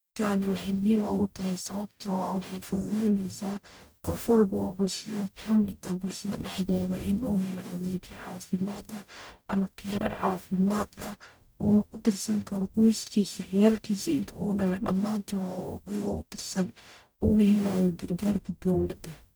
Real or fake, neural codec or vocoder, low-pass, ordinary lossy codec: fake; codec, 44.1 kHz, 0.9 kbps, DAC; none; none